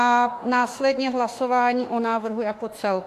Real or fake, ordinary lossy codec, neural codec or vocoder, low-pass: fake; AAC, 64 kbps; autoencoder, 48 kHz, 32 numbers a frame, DAC-VAE, trained on Japanese speech; 14.4 kHz